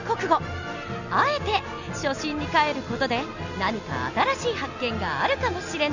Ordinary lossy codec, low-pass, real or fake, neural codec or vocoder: none; 7.2 kHz; real; none